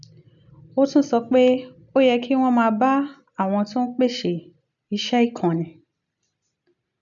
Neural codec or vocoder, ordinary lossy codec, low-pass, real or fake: none; none; 7.2 kHz; real